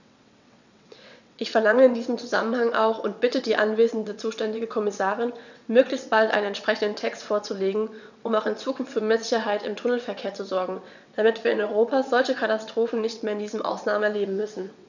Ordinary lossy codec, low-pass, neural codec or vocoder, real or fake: none; 7.2 kHz; vocoder, 22.05 kHz, 80 mel bands, WaveNeXt; fake